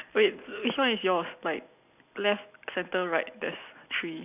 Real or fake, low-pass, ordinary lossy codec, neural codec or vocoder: real; 3.6 kHz; none; none